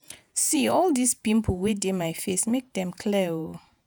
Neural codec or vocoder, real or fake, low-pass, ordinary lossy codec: vocoder, 48 kHz, 128 mel bands, Vocos; fake; none; none